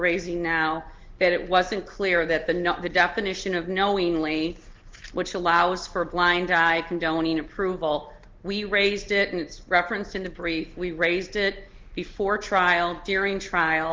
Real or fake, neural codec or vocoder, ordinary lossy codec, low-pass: real; none; Opus, 16 kbps; 7.2 kHz